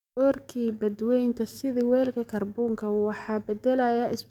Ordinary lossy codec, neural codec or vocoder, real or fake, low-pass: none; codec, 44.1 kHz, 7.8 kbps, DAC; fake; 19.8 kHz